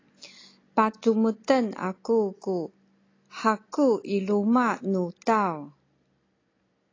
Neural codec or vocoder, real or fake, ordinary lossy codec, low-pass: none; real; AAC, 32 kbps; 7.2 kHz